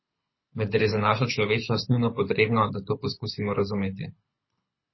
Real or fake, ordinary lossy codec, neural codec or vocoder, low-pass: fake; MP3, 24 kbps; codec, 24 kHz, 6 kbps, HILCodec; 7.2 kHz